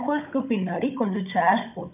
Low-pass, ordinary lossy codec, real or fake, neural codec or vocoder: 3.6 kHz; none; fake; codec, 16 kHz, 16 kbps, FunCodec, trained on Chinese and English, 50 frames a second